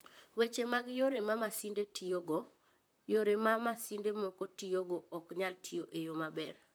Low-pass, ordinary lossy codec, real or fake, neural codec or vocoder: none; none; fake; vocoder, 44.1 kHz, 128 mel bands, Pupu-Vocoder